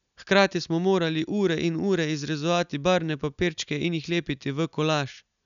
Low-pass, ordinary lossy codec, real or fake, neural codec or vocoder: 7.2 kHz; none; real; none